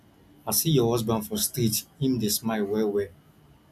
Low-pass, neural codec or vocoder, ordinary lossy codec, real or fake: 14.4 kHz; none; none; real